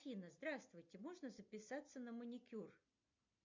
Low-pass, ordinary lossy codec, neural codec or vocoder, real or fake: 7.2 kHz; MP3, 48 kbps; none; real